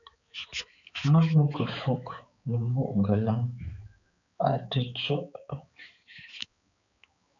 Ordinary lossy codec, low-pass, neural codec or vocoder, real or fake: MP3, 96 kbps; 7.2 kHz; codec, 16 kHz, 4 kbps, X-Codec, HuBERT features, trained on balanced general audio; fake